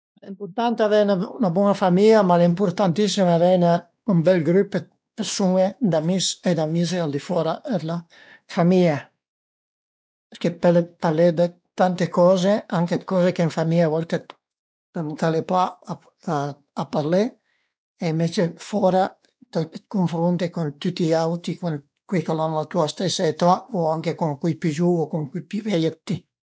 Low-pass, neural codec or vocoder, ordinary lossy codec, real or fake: none; codec, 16 kHz, 2 kbps, X-Codec, WavLM features, trained on Multilingual LibriSpeech; none; fake